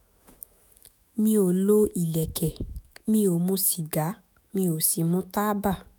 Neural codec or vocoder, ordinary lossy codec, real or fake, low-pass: autoencoder, 48 kHz, 128 numbers a frame, DAC-VAE, trained on Japanese speech; none; fake; none